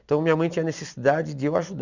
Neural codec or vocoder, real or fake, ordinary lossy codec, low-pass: none; real; none; 7.2 kHz